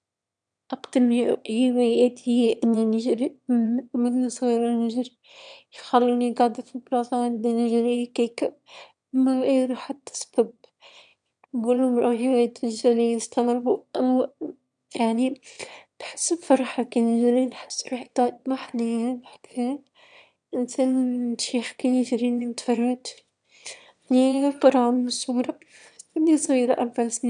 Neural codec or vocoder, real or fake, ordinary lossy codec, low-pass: autoencoder, 22.05 kHz, a latent of 192 numbers a frame, VITS, trained on one speaker; fake; none; 9.9 kHz